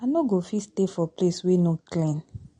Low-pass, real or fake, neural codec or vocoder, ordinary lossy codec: 9.9 kHz; real; none; MP3, 48 kbps